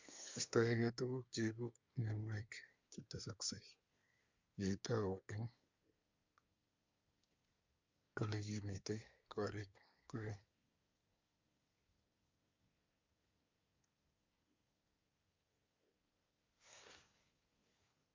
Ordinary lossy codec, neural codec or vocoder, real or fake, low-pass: MP3, 64 kbps; codec, 24 kHz, 1 kbps, SNAC; fake; 7.2 kHz